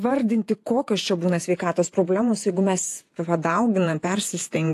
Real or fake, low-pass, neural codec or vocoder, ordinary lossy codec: fake; 14.4 kHz; vocoder, 48 kHz, 128 mel bands, Vocos; AAC, 64 kbps